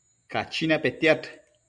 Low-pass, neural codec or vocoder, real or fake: 9.9 kHz; none; real